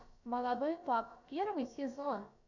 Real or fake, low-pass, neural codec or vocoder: fake; 7.2 kHz; codec, 16 kHz, about 1 kbps, DyCAST, with the encoder's durations